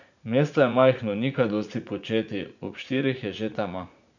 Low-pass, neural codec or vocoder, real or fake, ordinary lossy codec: 7.2 kHz; vocoder, 44.1 kHz, 80 mel bands, Vocos; fake; none